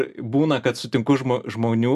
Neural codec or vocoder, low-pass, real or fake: none; 14.4 kHz; real